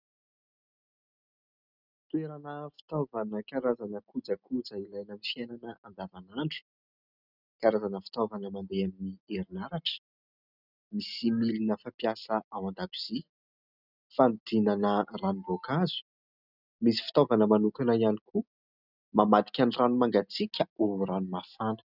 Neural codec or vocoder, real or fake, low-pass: none; real; 5.4 kHz